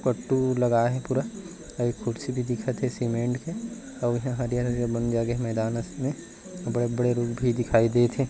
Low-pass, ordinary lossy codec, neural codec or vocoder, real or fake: none; none; none; real